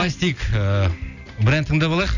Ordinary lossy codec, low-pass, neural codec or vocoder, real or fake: none; 7.2 kHz; none; real